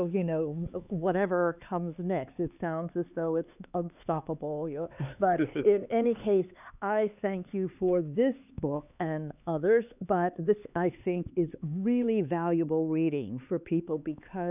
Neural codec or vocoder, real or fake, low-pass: codec, 16 kHz, 4 kbps, X-Codec, HuBERT features, trained on LibriSpeech; fake; 3.6 kHz